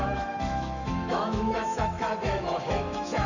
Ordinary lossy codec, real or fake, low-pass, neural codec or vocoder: none; fake; 7.2 kHz; autoencoder, 48 kHz, 128 numbers a frame, DAC-VAE, trained on Japanese speech